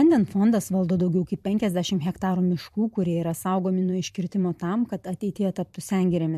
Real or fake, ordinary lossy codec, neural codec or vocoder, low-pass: fake; MP3, 64 kbps; vocoder, 44.1 kHz, 128 mel bands every 512 samples, BigVGAN v2; 14.4 kHz